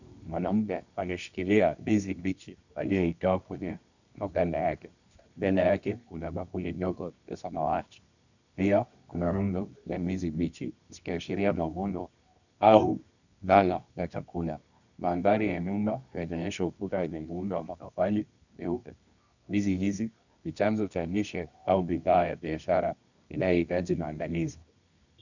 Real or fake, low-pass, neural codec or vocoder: fake; 7.2 kHz; codec, 24 kHz, 0.9 kbps, WavTokenizer, medium music audio release